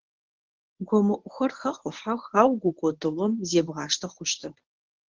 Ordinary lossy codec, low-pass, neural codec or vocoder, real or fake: Opus, 16 kbps; 7.2 kHz; codec, 24 kHz, 0.9 kbps, WavTokenizer, medium speech release version 1; fake